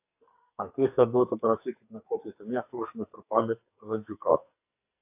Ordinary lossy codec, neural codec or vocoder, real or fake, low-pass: AAC, 32 kbps; codec, 44.1 kHz, 2.6 kbps, SNAC; fake; 3.6 kHz